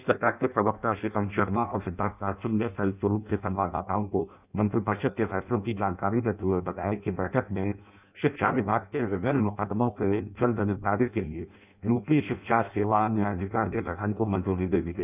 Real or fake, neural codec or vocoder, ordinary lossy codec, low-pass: fake; codec, 16 kHz in and 24 kHz out, 0.6 kbps, FireRedTTS-2 codec; none; 3.6 kHz